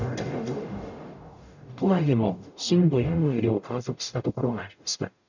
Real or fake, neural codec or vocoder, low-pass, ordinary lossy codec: fake; codec, 44.1 kHz, 0.9 kbps, DAC; 7.2 kHz; none